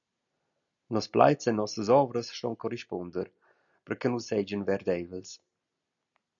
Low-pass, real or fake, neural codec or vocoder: 7.2 kHz; real; none